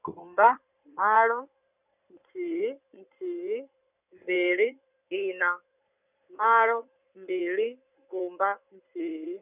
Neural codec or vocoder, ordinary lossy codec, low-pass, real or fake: codec, 16 kHz in and 24 kHz out, 2.2 kbps, FireRedTTS-2 codec; none; 3.6 kHz; fake